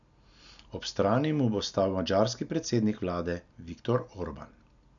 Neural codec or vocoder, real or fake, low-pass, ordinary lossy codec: none; real; 7.2 kHz; none